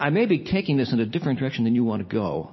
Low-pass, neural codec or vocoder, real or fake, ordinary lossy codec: 7.2 kHz; none; real; MP3, 24 kbps